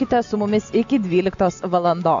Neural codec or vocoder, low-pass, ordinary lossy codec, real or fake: none; 7.2 kHz; MP3, 64 kbps; real